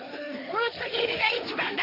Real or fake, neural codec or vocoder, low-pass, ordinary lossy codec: fake; codec, 16 kHz, 1.1 kbps, Voila-Tokenizer; 5.4 kHz; none